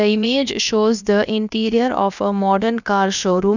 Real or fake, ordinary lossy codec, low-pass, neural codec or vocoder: fake; none; 7.2 kHz; codec, 16 kHz, about 1 kbps, DyCAST, with the encoder's durations